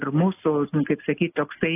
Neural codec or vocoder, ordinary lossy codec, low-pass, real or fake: none; AAC, 24 kbps; 3.6 kHz; real